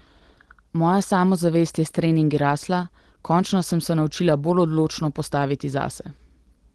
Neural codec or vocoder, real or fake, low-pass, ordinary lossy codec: none; real; 10.8 kHz; Opus, 16 kbps